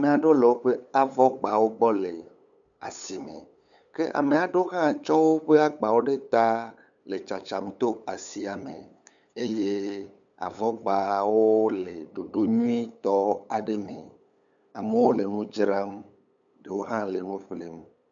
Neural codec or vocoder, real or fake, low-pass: codec, 16 kHz, 8 kbps, FunCodec, trained on LibriTTS, 25 frames a second; fake; 7.2 kHz